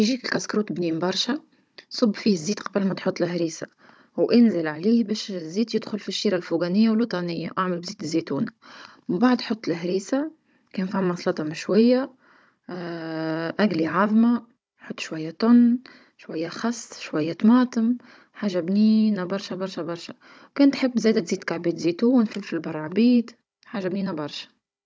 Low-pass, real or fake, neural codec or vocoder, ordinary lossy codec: none; fake; codec, 16 kHz, 16 kbps, FunCodec, trained on Chinese and English, 50 frames a second; none